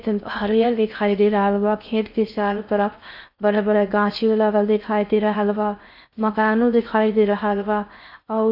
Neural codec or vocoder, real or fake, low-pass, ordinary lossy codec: codec, 16 kHz in and 24 kHz out, 0.6 kbps, FocalCodec, streaming, 4096 codes; fake; 5.4 kHz; none